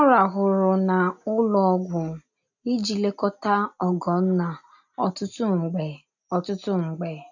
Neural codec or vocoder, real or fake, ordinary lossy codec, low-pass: none; real; none; 7.2 kHz